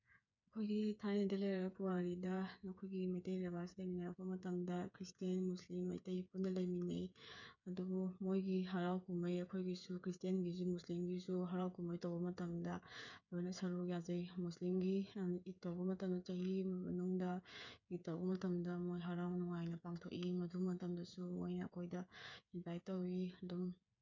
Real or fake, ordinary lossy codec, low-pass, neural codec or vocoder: fake; none; 7.2 kHz; codec, 16 kHz, 8 kbps, FreqCodec, smaller model